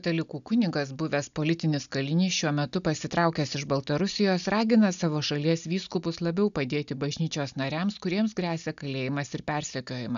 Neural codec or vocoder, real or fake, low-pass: none; real; 7.2 kHz